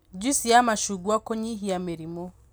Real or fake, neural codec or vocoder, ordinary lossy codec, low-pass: real; none; none; none